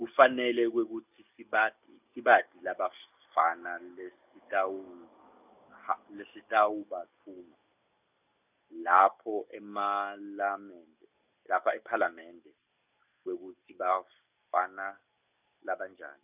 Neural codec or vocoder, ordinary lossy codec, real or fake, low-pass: none; none; real; 3.6 kHz